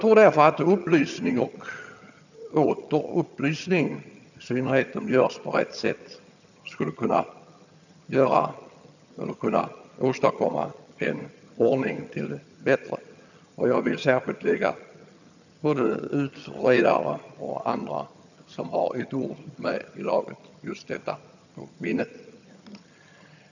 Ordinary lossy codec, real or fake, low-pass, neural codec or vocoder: none; fake; 7.2 kHz; vocoder, 22.05 kHz, 80 mel bands, HiFi-GAN